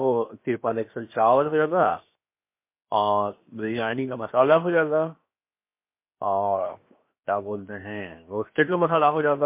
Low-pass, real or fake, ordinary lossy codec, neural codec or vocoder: 3.6 kHz; fake; MP3, 24 kbps; codec, 16 kHz, 0.7 kbps, FocalCodec